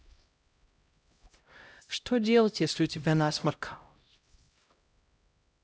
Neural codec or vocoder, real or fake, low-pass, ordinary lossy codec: codec, 16 kHz, 0.5 kbps, X-Codec, HuBERT features, trained on LibriSpeech; fake; none; none